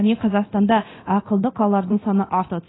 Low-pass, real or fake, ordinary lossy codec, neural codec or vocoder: 7.2 kHz; fake; AAC, 16 kbps; codec, 24 kHz, 0.9 kbps, DualCodec